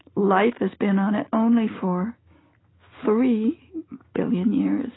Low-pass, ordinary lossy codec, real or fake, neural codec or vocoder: 7.2 kHz; AAC, 16 kbps; real; none